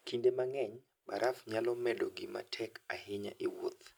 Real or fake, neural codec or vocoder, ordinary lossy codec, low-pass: real; none; none; none